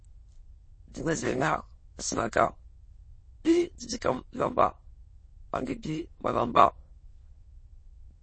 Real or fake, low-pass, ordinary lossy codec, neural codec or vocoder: fake; 9.9 kHz; MP3, 32 kbps; autoencoder, 22.05 kHz, a latent of 192 numbers a frame, VITS, trained on many speakers